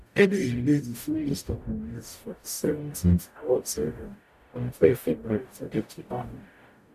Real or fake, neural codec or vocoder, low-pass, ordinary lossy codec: fake; codec, 44.1 kHz, 0.9 kbps, DAC; 14.4 kHz; none